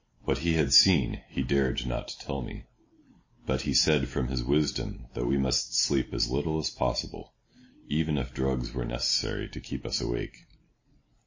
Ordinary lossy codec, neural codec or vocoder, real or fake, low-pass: MP3, 32 kbps; none; real; 7.2 kHz